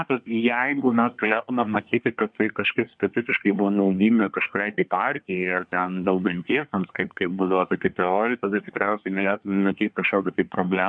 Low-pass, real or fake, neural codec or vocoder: 9.9 kHz; fake; codec, 24 kHz, 1 kbps, SNAC